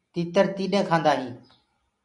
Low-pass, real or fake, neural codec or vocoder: 10.8 kHz; real; none